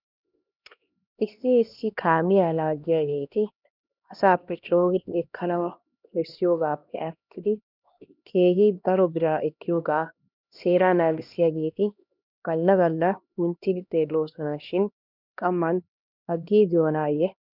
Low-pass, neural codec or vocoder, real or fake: 5.4 kHz; codec, 16 kHz, 1 kbps, X-Codec, HuBERT features, trained on LibriSpeech; fake